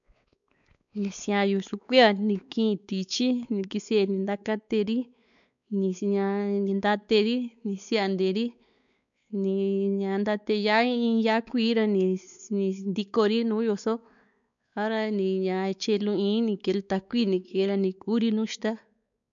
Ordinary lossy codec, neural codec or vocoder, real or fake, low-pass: none; codec, 16 kHz, 4 kbps, X-Codec, WavLM features, trained on Multilingual LibriSpeech; fake; 7.2 kHz